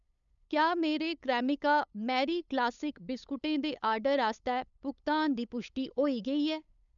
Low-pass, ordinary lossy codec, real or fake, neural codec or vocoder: 7.2 kHz; none; fake; codec, 16 kHz, 8 kbps, FunCodec, trained on LibriTTS, 25 frames a second